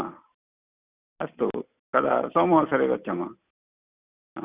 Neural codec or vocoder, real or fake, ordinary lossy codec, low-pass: none; real; Opus, 64 kbps; 3.6 kHz